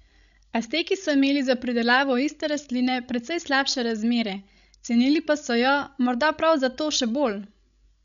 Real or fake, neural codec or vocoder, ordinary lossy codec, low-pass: fake; codec, 16 kHz, 16 kbps, FreqCodec, larger model; none; 7.2 kHz